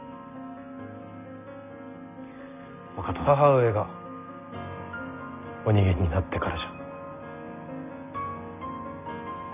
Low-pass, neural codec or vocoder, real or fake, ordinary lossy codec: 3.6 kHz; none; real; none